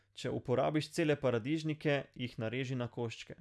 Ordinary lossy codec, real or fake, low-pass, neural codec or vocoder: none; real; none; none